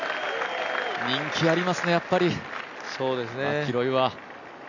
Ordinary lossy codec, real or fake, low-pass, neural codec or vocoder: none; real; 7.2 kHz; none